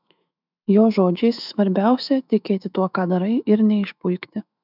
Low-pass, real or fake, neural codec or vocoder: 5.4 kHz; fake; autoencoder, 48 kHz, 128 numbers a frame, DAC-VAE, trained on Japanese speech